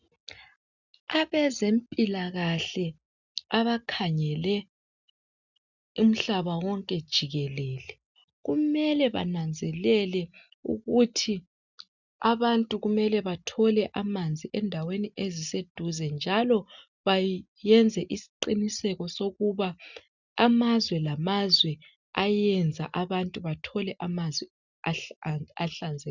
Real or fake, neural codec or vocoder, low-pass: real; none; 7.2 kHz